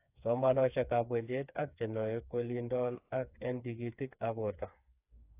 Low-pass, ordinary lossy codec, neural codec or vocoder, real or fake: 3.6 kHz; AAC, 24 kbps; codec, 16 kHz, 4 kbps, FreqCodec, smaller model; fake